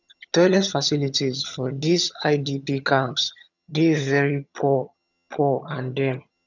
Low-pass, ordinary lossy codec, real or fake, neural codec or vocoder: 7.2 kHz; none; fake; vocoder, 22.05 kHz, 80 mel bands, HiFi-GAN